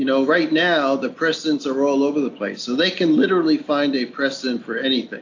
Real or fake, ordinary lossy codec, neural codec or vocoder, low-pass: real; AAC, 48 kbps; none; 7.2 kHz